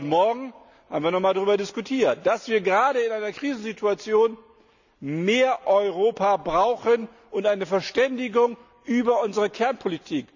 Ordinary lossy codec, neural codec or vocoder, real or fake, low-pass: none; none; real; 7.2 kHz